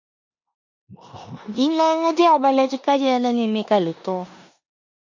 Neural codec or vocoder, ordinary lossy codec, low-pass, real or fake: codec, 16 kHz in and 24 kHz out, 0.9 kbps, LongCat-Audio-Codec, four codebook decoder; MP3, 64 kbps; 7.2 kHz; fake